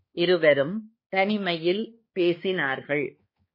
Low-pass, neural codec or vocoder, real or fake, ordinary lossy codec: 5.4 kHz; codec, 16 kHz, 2 kbps, X-Codec, HuBERT features, trained on balanced general audio; fake; MP3, 24 kbps